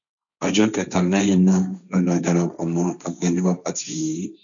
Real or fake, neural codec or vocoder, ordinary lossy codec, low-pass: fake; codec, 16 kHz, 1.1 kbps, Voila-Tokenizer; none; 7.2 kHz